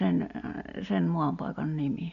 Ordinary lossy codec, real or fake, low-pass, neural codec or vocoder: none; real; 7.2 kHz; none